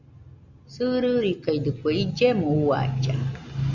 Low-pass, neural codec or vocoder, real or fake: 7.2 kHz; none; real